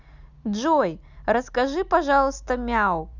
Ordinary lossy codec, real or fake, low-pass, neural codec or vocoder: none; real; 7.2 kHz; none